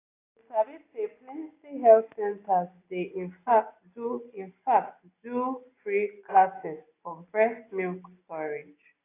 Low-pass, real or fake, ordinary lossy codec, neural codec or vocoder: 3.6 kHz; real; AAC, 24 kbps; none